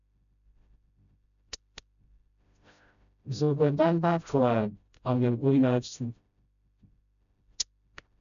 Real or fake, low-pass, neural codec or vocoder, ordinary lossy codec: fake; 7.2 kHz; codec, 16 kHz, 0.5 kbps, FreqCodec, smaller model; none